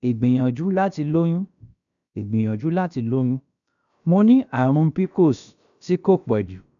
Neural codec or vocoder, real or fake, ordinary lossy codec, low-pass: codec, 16 kHz, about 1 kbps, DyCAST, with the encoder's durations; fake; MP3, 96 kbps; 7.2 kHz